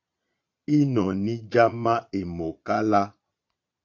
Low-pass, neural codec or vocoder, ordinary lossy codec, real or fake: 7.2 kHz; vocoder, 22.05 kHz, 80 mel bands, Vocos; AAC, 48 kbps; fake